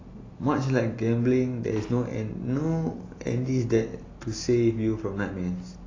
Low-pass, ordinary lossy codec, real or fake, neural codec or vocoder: 7.2 kHz; AAC, 32 kbps; real; none